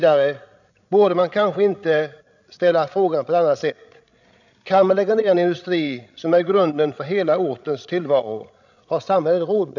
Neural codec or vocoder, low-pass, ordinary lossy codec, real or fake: codec, 16 kHz, 16 kbps, FreqCodec, larger model; 7.2 kHz; none; fake